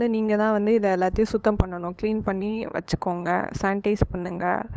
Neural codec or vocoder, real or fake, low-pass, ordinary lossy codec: codec, 16 kHz, 8 kbps, FunCodec, trained on LibriTTS, 25 frames a second; fake; none; none